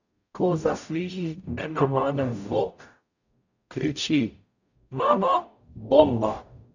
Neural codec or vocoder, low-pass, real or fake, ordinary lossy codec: codec, 44.1 kHz, 0.9 kbps, DAC; 7.2 kHz; fake; none